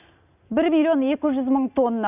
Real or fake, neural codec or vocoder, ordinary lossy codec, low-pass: real; none; none; 3.6 kHz